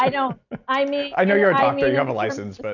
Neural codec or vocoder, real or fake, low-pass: none; real; 7.2 kHz